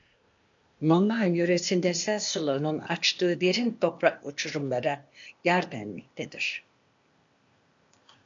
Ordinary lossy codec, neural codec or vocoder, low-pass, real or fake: MP3, 48 kbps; codec, 16 kHz, 0.8 kbps, ZipCodec; 7.2 kHz; fake